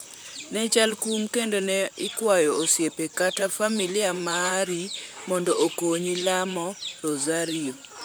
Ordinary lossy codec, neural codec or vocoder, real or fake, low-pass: none; vocoder, 44.1 kHz, 128 mel bands, Pupu-Vocoder; fake; none